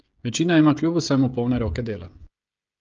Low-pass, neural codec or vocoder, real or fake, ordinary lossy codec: 7.2 kHz; codec, 16 kHz, 16 kbps, FreqCodec, smaller model; fake; Opus, 24 kbps